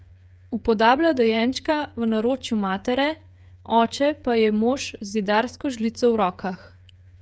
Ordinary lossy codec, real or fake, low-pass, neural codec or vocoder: none; fake; none; codec, 16 kHz, 8 kbps, FreqCodec, smaller model